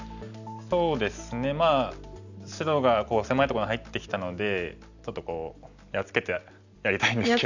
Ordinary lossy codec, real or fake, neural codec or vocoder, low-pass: none; real; none; 7.2 kHz